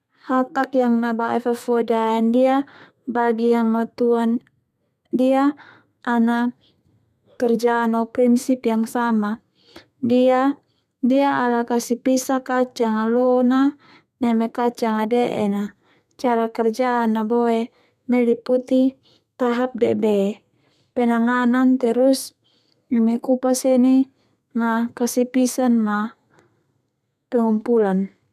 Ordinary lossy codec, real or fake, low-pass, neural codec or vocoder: none; fake; 14.4 kHz; codec, 32 kHz, 1.9 kbps, SNAC